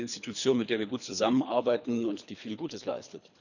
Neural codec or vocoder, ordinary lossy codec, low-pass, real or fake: codec, 24 kHz, 3 kbps, HILCodec; none; 7.2 kHz; fake